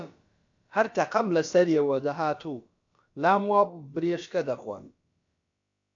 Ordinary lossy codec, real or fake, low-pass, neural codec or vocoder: AAC, 64 kbps; fake; 7.2 kHz; codec, 16 kHz, about 1 kbps, DyCAST, with the encoder's durations